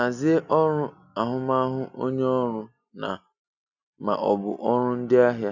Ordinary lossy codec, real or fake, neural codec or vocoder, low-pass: none; real; none; 7.2 kHz